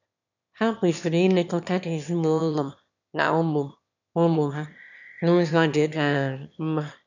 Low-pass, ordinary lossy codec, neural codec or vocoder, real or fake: 7.2 kHz; none; autoencoder, 22.05 kHz, a latent of 192 numbers a frame, VITS, trained on one speaker; fake